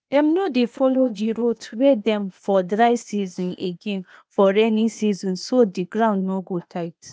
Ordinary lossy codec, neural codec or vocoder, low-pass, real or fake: none; codec, 16 kHz, 0.8 kbps, ZipCodec; none; fake